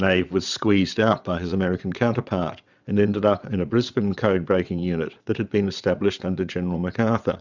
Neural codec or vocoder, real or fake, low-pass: vocoder, 22.05 kHz, 80 mel bands, WaveNeXt; fake; 7.2 kHz